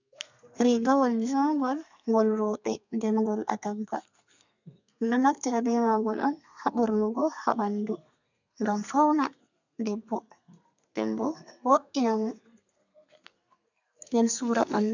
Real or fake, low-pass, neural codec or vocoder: fake; 7.2 kHz; codec, 32 kHz, 1.9 kbps, SNAC